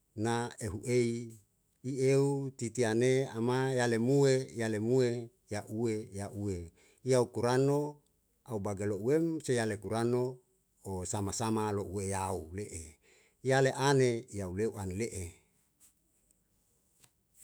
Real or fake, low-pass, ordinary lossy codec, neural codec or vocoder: fake; none; none; autoencoder, 48 kHz, 128 numbers a frame, DAC-VAE, trained on Japanese speech